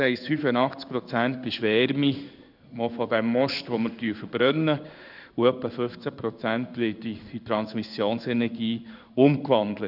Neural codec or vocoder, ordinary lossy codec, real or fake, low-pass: codec, 16 kHz in and 24 kHz out, 1 kbps, XY-Tokenizer; none; fake; 5.4 kHz